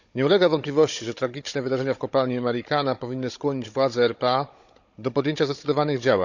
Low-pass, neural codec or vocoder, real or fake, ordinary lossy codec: 7.2 kHz; codec, 16 kHz, 16 kbps, FunCodec, trained on Chinese and English, 50 frames a second; fake; none